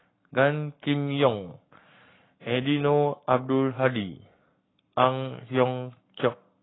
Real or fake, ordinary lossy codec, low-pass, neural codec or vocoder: fake; AAC, 16 kbps; 7.2 kHz; codec, 44.1 kHz, 7.8 kbps, Pupu-Codec